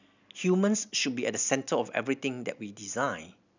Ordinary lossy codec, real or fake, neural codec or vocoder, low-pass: none; real; none; 7.2 kHz